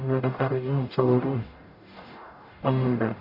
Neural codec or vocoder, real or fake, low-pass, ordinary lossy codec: codec, 44.1 kHz, 0.9 kbps, DAC; fake; 5.4 kHz; none